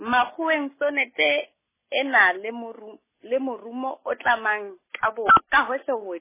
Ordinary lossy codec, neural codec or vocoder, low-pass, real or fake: MP3, 16 kbps; none; 3.6 kHz; real